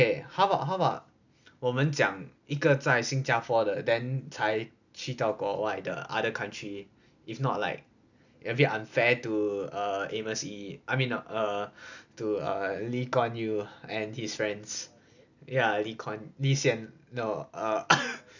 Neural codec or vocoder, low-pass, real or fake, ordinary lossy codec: none; 7.2 kHz; real; none